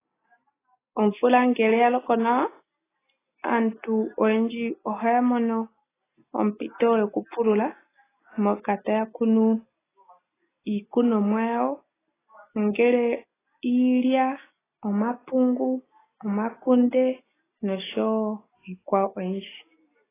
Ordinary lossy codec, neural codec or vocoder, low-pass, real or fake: AAC, 16 kbps; none; 3.6 kHz; real